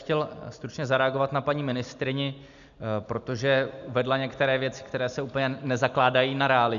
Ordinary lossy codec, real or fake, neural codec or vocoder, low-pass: MP3, 96 kbps; real; none; 7.2 kHz